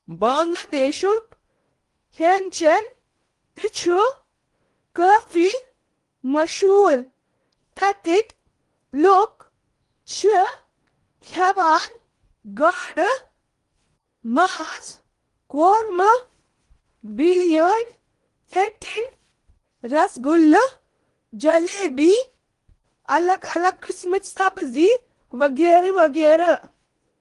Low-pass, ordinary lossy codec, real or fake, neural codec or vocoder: 10.8 kHz; Opus, 24 kbps; fake; codec, 16 kHz in and 24 kHz out, 0.8 kbps, FocalCodec, streaming, 65536 codes